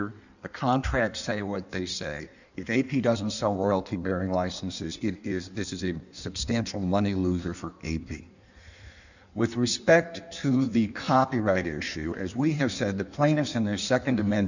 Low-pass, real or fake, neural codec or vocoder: 7.2 kHz; fake; codec, 16 kHz in and 24 kHz out, 1.1 kbps, FireRedTTS-2 codec